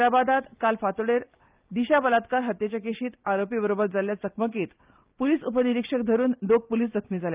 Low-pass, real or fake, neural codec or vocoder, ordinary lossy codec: 3.6 kHz; real; none; Opus, 24 kbps